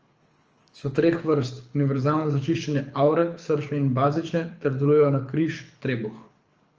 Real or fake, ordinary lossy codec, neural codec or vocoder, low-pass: fake; Opus, 24 kbps; codec, 24 kHz, 6 kbps, HILCodec; 7.2 kHz